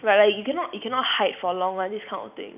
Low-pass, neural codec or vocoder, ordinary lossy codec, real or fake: 3.6 kHz; none; none; real